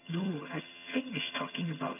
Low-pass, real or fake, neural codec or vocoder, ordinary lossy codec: 3.6 kHz; fake; vocoder, 22.05 kHz, 80 mel bands, HiFi-GAN; none